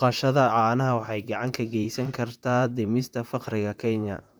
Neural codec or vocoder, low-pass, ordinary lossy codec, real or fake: vocoder, 44.1 kHz, 128 mel bands, Pupu-Vocoder; none; none; fake